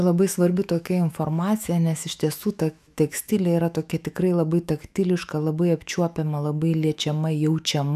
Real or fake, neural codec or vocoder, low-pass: fake; autoencoder, 48 kHz, 128 numbers a frame, DAC-VAE, trained on Japanese speech; 14.4 kHz